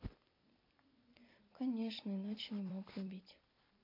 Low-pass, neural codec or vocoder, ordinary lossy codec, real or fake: 5.4 kHz; vocoder, 44.1 kHz, 128 mel bands every 256 samples, BigVGAN v2; MP3, 32 kbps; fake